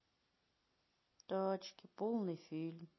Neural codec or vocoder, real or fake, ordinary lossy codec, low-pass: none; real; MP3, 24 kbps; 7.2 kHz